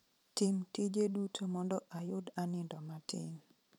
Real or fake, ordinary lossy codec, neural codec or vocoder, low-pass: fake; none; vocoder, 44.1 kHz, 128 mel bands every 512 samples, BigVGAN v2; 19.8 kHz